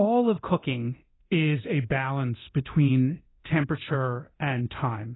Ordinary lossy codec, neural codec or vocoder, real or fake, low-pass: AAC, 16 kbps; codec, 24 kHz, 0.9 kbps, DualCodec; fake; 7.2 kHz